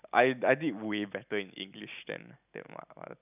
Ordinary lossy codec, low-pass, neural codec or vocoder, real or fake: none; 3.6 kHz; none; real